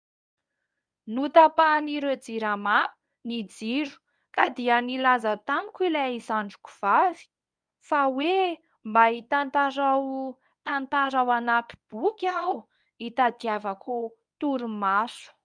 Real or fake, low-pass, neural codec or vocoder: fake; 9.9 kHz; codec, 24 kHz, 0.9 kbps, WavTokenizer, medium speech release version 1